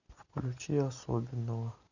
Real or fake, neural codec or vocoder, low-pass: real; none; 7.2 kHz